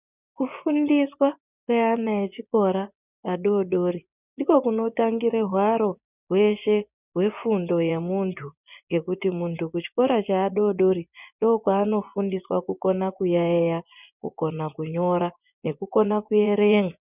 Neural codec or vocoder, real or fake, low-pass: vocoder, 24 kHz, 100 mel bands, Vocos; fake; 3.6 kHz